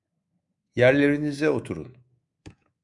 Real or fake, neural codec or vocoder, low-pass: fake; codec, 24 kHz, 3.1 kbps, DualCodec; 10.8 kHz